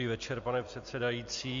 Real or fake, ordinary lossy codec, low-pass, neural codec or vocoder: real; MP3, 48 kbps; 7.2 kHz; none